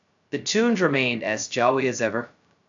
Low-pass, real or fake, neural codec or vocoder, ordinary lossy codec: 7.2 kHz; fake; codec, 16 kHz, 0.2 kbps, FocalCodec; AAC, 64 kbps